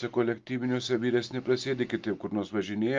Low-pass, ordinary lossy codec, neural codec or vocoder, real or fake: 7.2 kHz; Opus, 32 kbps; none; real